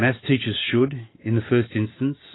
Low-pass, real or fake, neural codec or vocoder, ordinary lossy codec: 7.2 kHz; real; none; AAC, 16 kbps